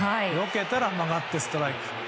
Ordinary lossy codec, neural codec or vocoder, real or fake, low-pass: none; none; real; none